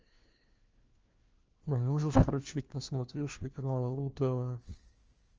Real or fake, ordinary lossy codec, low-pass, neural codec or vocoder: fake; Opus, 24 kbps; 7.2 kHz; codec, 16 kHz, 1 kbps, FunCodec, trained on LibriTTS, 50 frames a second